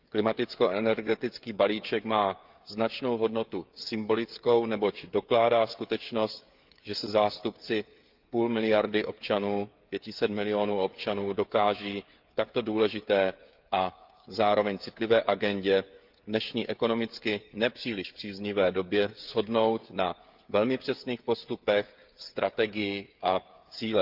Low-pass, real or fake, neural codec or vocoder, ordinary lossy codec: 5.4 kHz; fake; codec, 16 kHz, 16 kbps, FreqCodec, smaller model; Opus, 32 kbps